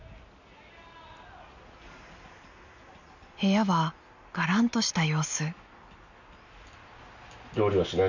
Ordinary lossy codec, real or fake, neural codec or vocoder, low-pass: none; real; none; 7.2 kHz